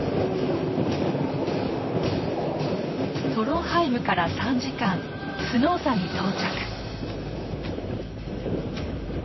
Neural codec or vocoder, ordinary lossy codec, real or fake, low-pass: vocoder, 44.1 kHz, 128 mel bands, Pupu-Vocoder; MP3, 24 kbps; fake; 7.2 kHz